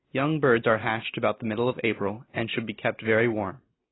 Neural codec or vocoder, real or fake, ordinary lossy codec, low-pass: none; real; AAC, 16 kbps; 7.2 kHz